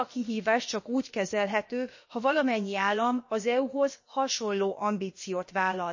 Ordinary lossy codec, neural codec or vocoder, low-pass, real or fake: MP3, 32 kbps; codec, 16 kHz, about 1 kbps, DyCAST, with the encoder's durations; 7.2 kHz; fake